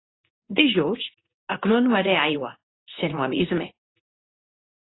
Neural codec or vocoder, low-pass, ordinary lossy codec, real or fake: codec, 24 kHz, 0.9 kbps, WavTokenizer, medium speech release version 2; 7.2 kHz; AAC, 16 kbps; fake